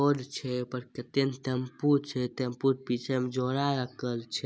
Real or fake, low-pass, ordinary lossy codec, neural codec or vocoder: real; none; none; none